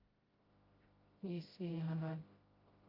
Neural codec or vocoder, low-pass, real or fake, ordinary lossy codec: codec, 16 kHz, 0.5 kbps, FreqCodec, smaller model; 5.4 kHz; fake; Opus, 32 kbps